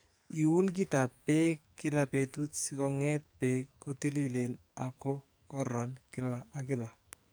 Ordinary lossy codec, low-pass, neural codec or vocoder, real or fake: none; none; codec, 44.1 kHz, 2.6 kbps, SNAC; fake